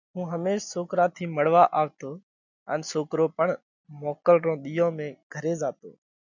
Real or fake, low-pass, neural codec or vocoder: real; 7.2 kHz; none